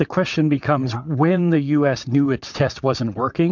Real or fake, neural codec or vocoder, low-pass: fake; vocoder, 22.05 kHz, 80 mel bands, WaveNeXt; 7.2 kHz